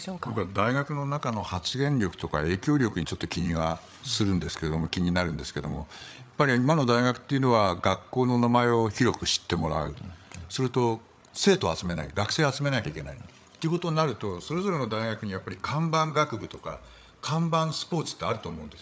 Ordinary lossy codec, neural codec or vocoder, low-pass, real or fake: none; codec, 16 kHz, 8 kbps, FreqCodec, larger model; none; fake